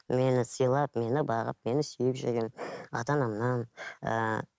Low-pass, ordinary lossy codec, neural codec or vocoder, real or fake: none; none; none; real